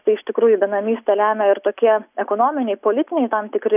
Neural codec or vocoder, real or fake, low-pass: none; real; 3.6 kHz